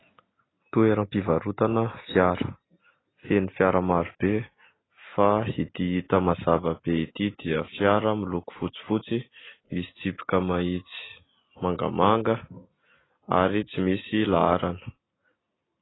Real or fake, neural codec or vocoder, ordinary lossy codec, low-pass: real; none; AAC, 16 kbps; 7.2 kHz